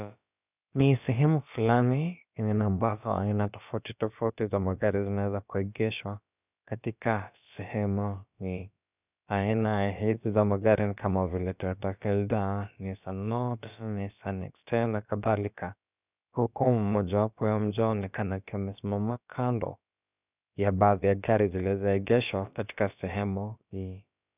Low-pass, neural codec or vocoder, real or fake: 3.6 kHz; codec, 16 kHz, about 1 kbps, DyCAST, with the encoder's durations; fake